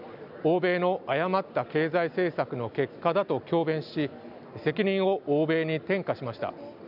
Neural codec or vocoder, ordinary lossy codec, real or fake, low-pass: none; none; real; 5.4 kHz